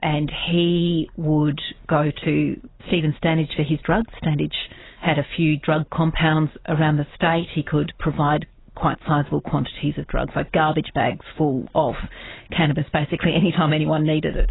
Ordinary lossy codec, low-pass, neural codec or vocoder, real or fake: AAC, 16 kbps; 7.2 kHz; none; real